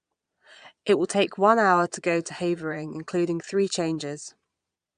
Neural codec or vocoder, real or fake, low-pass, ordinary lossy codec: vocoder, 22.05 kHz, 80 mel bands, Vocos; fake; 9.9 kHz; none